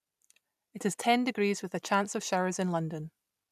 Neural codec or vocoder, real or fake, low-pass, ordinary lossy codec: none; real; 14.4 kHz; none